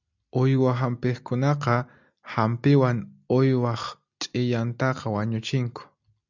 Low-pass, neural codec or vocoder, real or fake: 7.2 kHz; none; real